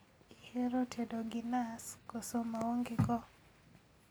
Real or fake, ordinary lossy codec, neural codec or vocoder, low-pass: real; none; none; none